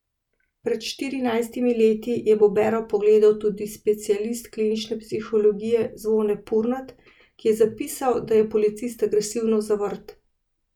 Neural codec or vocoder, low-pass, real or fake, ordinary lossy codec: none; 19.8 kHz; real; none